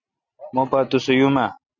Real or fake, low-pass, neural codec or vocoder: real; 7.2 kHz; none